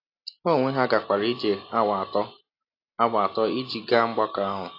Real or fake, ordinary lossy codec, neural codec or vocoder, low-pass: real; AAC, 32 kbps; none; 5.4 kHz